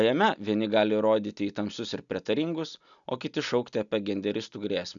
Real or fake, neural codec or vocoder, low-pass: real; none; 7.2 kHz